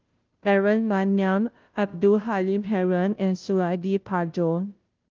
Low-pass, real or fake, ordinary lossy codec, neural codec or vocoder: 7.2 kHz; fake; Opus, 32 kbps; codec, 16 kHz, 0.5 kbps, FunCodec, trained on Chinese and English, 25 frames a second